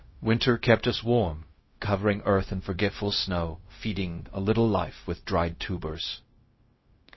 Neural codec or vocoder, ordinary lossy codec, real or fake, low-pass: codec, 16 kHz, 0.4 kbps, LongCat-Audio-Codec; MP3, 24 kbps; fake; 7.2 kHz